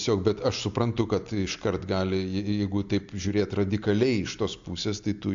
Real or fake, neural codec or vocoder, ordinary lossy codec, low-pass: real; none; AAC, 64 kbps; 7.2 kHz